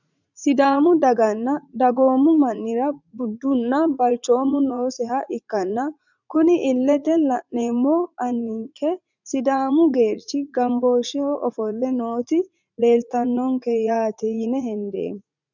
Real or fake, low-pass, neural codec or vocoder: fake; 7.2 kHz; vocoder, 44.1 kHz, 80 mel bands, Vocos